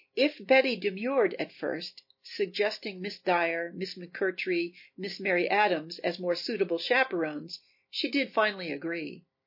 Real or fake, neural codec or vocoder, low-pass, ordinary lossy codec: real; none; 5.4 kHz; MP3, 32 kbps